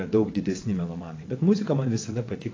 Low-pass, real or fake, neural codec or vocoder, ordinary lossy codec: 7.2 kHz; fake; vocoder, 22.05 kHz, 80 mel bands, WaveNeXt; AAC, 32 kbps